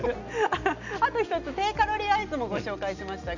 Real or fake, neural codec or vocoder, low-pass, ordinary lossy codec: real; none; 7.2 kHz; none